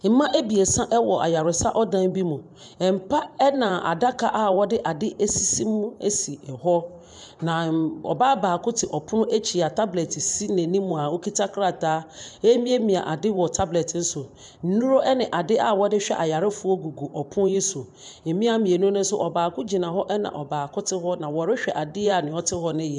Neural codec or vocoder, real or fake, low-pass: vocoder, 44.1 kHz, 128 mel bands every 512 samples, BigVGAN v2; fake; 10.8 kHz